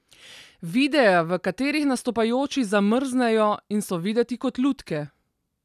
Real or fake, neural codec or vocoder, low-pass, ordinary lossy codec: real; none; 14.4 kHz; none